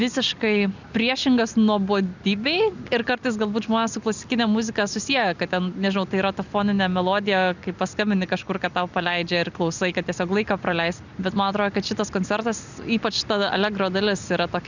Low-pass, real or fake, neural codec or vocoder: 7.2 kHz; real; none